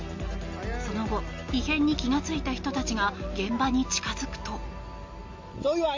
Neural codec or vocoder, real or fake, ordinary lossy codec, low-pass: none; real; MP3, 48 kbps; 7.2 kHz